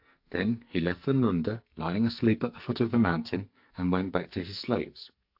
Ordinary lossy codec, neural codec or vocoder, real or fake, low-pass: AAC, 48 kbps; codec, 44.1 kHz, 2.6 kbps, SNAC; fake; 5.4 kHz